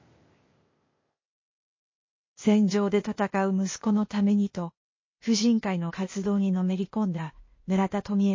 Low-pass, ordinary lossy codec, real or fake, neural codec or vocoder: 7.2 kHz; MP3, 32 kbps; fake; codec, 16 kHz, 0.8 kbps, ZipCodec